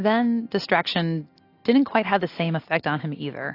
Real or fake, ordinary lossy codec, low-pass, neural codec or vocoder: real; AAC, 32 kbps; 5.4 kHz; none